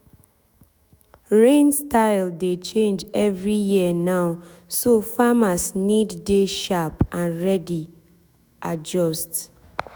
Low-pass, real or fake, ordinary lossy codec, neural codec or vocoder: none; fake; none; autoencoder, 48 kHz, 128 numbers a frame, DAC-VAE, trained on Japanese speech